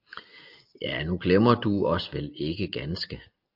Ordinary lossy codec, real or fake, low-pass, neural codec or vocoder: MP3, 48 kbps; real; 5.4 kHz; none